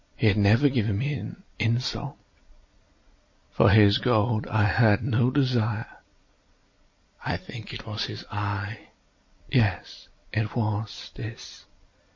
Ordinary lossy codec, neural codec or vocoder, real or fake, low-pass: MP3, 32 kbps; none; real; 7.2 kHz